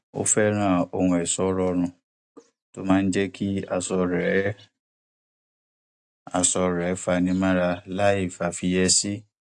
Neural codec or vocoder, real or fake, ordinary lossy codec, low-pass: none; real; AAC, 64 kbps; 10.8 kHz